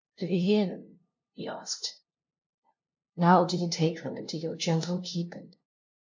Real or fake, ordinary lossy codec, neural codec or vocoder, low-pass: fake; MP3, 48 kbps; codec, 16 kHz, 0.5 kbps, FunCodec, trained on LibriTTS, 25 frames a second; 7.2 kHz